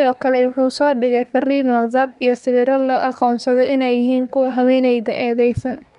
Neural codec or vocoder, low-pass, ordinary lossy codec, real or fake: codec, 24 kHz, 1 kbps, SNAC; 10.8 kHz; none; fake